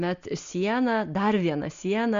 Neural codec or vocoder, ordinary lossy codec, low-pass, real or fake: none; Opus, 64 kbps; 7.2 kHz; real